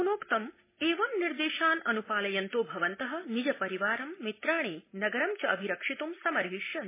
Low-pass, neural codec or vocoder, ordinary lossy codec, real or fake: 3.6 kHz; none; MP3, 16 kbps; real